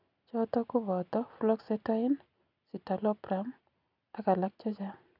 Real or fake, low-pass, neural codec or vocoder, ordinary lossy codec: real; 5.4 kHz; none; none